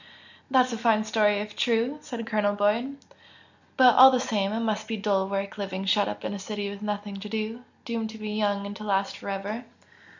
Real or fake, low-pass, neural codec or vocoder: real; 7.2 kHz; none